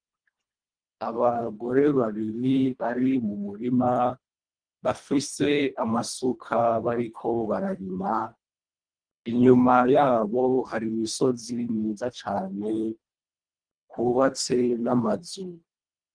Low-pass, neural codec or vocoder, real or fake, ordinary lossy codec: 9.9 kHz; codec, 24 kHz, 1.5 kbps, HILCodec; fake; Opus, 32 kbps